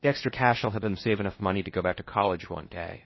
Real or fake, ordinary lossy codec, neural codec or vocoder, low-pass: fake; MP3, 24 kbps; codec, 16 kHz in and 24 kHz out, 0.6 kbps, FocalCodec, streaming, 4096 codes; 7.2 kHz